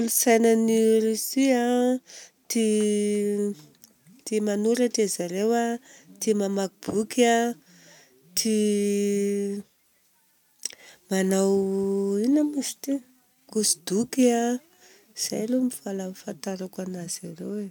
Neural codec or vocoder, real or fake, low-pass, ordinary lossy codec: none; real; 19.8 kHz; none